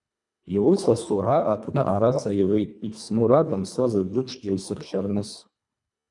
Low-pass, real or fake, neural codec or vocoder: 10.8 kHz; fake; codec, 24 kHz, 1.5 kbps, HILCodec